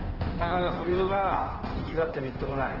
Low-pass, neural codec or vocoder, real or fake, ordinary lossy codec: 5.4 kHz; codec, 16 kHz in and 24 kHz out, 1.1 kbps, FireRedTTS-2 codec; fake; Opus, 16 kbps